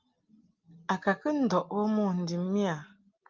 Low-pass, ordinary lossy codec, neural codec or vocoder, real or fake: 7.2 kHz; Opus, 24 kbps; none; real